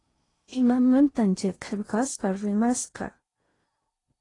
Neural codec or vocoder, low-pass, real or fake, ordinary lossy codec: codec, 16 kHz in and 24 kHz out, 0.6 kbps, FocalCodec, streaming, 2048 codes; 10.8 kHz; fake; AAC, 32 kbps